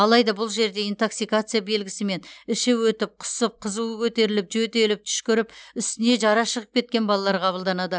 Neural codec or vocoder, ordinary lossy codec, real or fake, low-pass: none; none; real; none